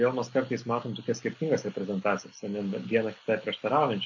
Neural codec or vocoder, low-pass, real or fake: none; 7.2 kHz; real